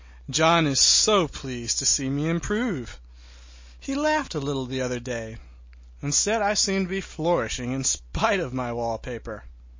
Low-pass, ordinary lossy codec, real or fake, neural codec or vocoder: 7.2 kHz; MP3, 32 kbps; real; none